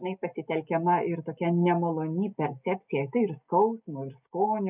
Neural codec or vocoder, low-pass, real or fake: none; 3.6 kHz; real